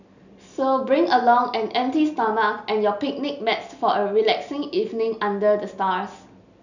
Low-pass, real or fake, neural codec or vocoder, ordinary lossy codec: 7.2 kHz; real; none; none